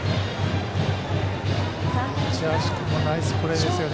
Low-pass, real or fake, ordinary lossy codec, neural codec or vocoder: none; real; none; none